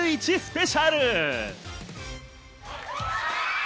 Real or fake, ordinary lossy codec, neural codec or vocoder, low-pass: real; none; none; none